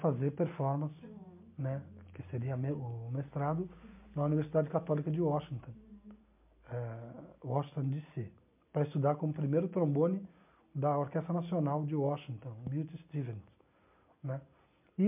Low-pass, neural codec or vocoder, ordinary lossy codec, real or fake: 3.6 kHz; none; MP3, 32 kbps; real